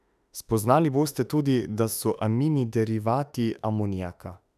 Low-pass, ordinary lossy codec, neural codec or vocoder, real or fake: 14.4 kHz; none; autoencoder, 48 kHz, 32 numbers a frame, DAC-VAE, trained on Japanese speech; fake